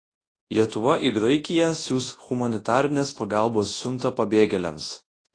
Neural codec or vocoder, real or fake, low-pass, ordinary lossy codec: codec, 24 kHz, 0.9 kbps, WavTokenizer, large speech release; fake; 9.9 kHz; AAC, 32 kbps